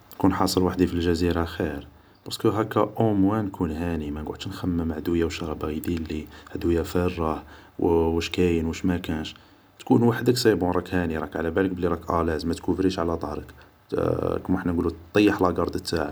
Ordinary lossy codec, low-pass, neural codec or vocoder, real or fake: none; none; none; real